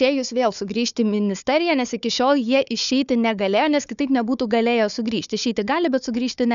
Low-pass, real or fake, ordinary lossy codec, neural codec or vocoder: 7.2 kHz; fake; MP3, 96 kbps; codec, 16 kHz, 4 kbps, FunCodec, trained on Chinese and English, 50 frames a second